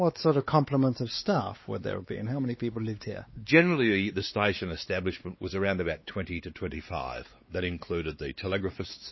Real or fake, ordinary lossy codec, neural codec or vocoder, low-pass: fake; MP3, 24 kbps; codec, 16 kHz, 4 kbps, X-Codec, WavLM features, trained on Multilingual LibriSpeech; 7.2 kHz